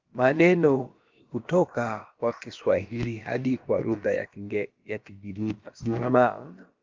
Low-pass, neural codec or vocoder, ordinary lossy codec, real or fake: 7.2 kHz; codec, 16 kHz, about 1 kbps, DyCAST, with the encoder's durations; Opus, 32 kbps; fake